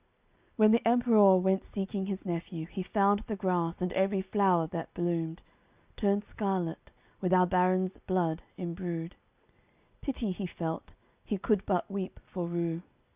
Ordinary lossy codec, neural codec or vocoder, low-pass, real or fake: Opus, 64 kbps; none; 3.6 kHz; real